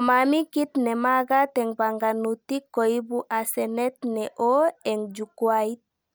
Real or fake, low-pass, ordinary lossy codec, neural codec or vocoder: real; none; none; none